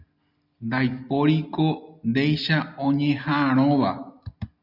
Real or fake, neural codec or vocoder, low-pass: real; none; 5.4 kHz